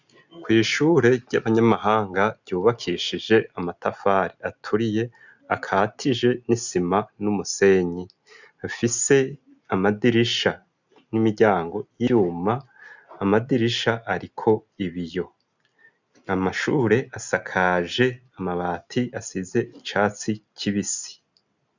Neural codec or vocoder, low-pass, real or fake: none; 7.2 kHz; real